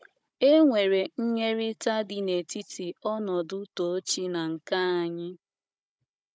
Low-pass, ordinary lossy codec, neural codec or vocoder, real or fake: none; none; codec, 16 kHz, 16 kbps, FunCodec, trained on Chinese and English, 50 frames a second; fake